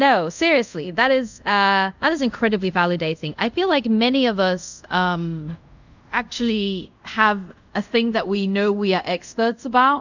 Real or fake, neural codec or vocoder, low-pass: fake; codec, 24 kHz, 0.5 kbps, DualCodec; 7.2 kHz